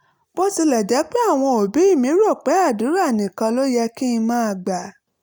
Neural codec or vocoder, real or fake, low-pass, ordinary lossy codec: none; real; none; none